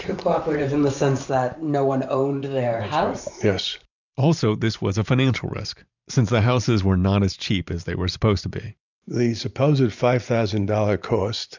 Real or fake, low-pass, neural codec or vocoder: fake; 7.2 kHz; vocoder, 44.1 kHz, 128 mel bands every 512 samples, BigVGAN v2